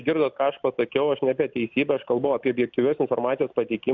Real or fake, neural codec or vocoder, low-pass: real; none; 7.2 kHz